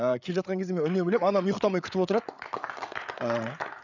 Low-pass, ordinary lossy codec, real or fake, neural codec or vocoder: 7.2 kHz; none; fake; codec, 16 kHz, 8 kbps, FreqCodec, larger model